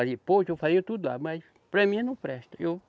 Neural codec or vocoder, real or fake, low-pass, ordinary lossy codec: none; real; none; none